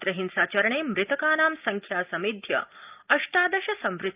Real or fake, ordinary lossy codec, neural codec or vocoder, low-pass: real; Opus, 24 kbps; none; 3.6 kHz